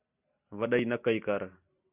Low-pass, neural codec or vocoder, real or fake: 3.6 kHz; none; real